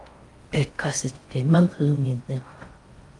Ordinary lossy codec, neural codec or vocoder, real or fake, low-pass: Opus, 32 kbps; codec, 16 kHz in and 24 kHz out, 0.6 kbps, FocalCodec, streaming, 4096 codes; fake; 10.8 kHz